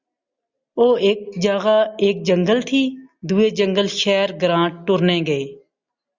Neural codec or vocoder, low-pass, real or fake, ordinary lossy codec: none; 7.2 kHz; real; Opus, 64 kbps